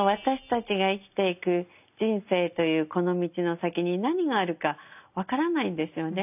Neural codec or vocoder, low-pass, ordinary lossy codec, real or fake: none; 3.6 kHz; none; real